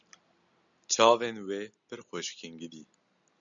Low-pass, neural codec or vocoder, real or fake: 7.2 kHz; none; real